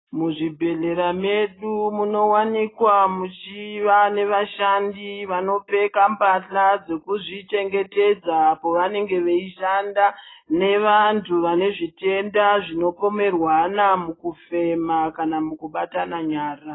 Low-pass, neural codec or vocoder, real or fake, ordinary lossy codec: 7.2 kHz; none; real; AAC, 16 kbps